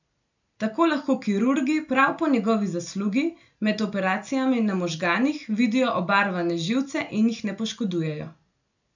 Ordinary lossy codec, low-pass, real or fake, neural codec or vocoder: none; 7.2 kHz; real; none